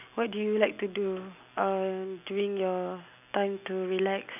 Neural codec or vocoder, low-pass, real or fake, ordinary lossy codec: none; 3.6 kHz; real; none